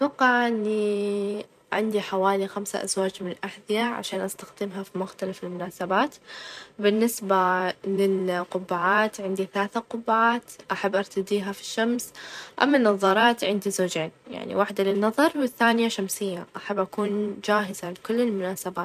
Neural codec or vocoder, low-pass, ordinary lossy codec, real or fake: vocoder, 44.1 kHz, 128 mel bands every 512 samples, BigVGAN v2; 14.4 kHz; none; fake